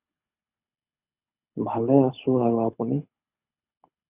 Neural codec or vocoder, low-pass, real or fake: codec, 24 kHz, 6 kbps, HILCodec; 3.6 kHz; fake